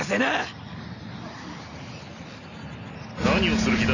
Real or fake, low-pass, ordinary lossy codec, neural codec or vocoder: real; 7.2 kHz; none; none